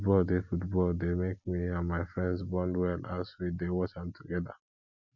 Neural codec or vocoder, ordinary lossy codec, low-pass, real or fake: none; none; 7.2 kHz; real